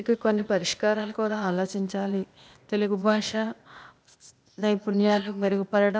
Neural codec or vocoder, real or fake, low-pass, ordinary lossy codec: codec, 16 kHz, 0.8 kbps, ZipCodec; fake; none; none